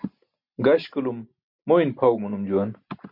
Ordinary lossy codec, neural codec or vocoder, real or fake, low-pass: MP3, 48 kbps; none; real; 5.4 kHz